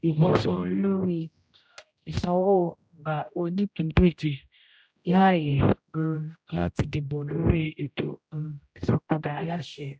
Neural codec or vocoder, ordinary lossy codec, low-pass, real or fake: codec, 16 kHz, 0.5 kbps, X-Codec, HuBERT features, trained on general audio; none; none; fake